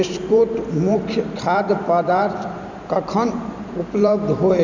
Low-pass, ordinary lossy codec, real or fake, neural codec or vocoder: 7.2 kHz; none; fake; vocoder, 44.1 kHz, 128 mel bands every 512 samples, BigVGAN v2